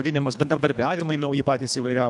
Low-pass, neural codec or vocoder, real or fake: 10.8 kHz; codec, 24 kHz, 1.5 kbps, HILCodec; fake